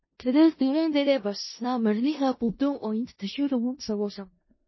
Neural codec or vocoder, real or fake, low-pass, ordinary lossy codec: codec, 16 kHz in and 24 kHz out, 0.4 kbps, LongCat-Audio-Codec, four codebook decoder; fake; 7.2 kHz; MP3, 24 kbps